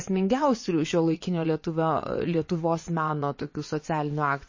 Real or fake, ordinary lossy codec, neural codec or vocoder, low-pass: fake; MP3, 32 kbps; vocoder, 22.05 kHz, 80 mel bands, WaveNeXt; 7.2 kHz